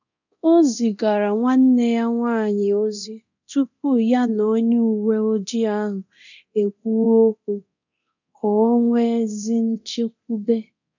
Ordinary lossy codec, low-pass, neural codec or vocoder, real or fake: none; 7.2 kHz; codec, 24 kHz, 0.9 kbps, DualCodec; fake